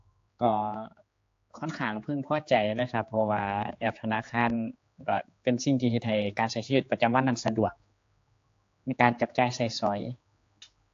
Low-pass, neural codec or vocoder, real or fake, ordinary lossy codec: 7.2 kHz; codec, 16 kHz, 4 kbps, X-Codec, HuBERT features, trained on general audio; fake; AAC, 48 kbps